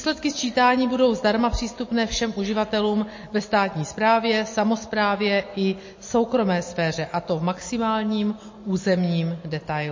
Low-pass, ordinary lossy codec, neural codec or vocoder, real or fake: 7.2 kHz; MP3, 32 kbps; none; real